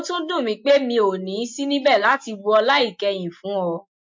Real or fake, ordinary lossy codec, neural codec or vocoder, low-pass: real; MP3, 48 kbps; none; 7.2 kHz